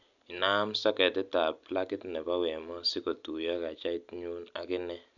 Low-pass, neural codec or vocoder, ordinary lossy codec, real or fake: 7.2 kHz; none; none; real